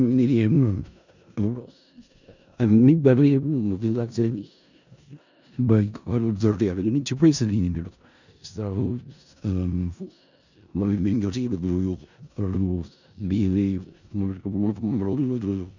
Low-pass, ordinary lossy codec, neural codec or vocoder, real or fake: 7.2 kHz; none; codec, 16 kHz in and 24 kHz out, 0.4 kbps, LongCat-Audio-Codec, four codebook decoder; fake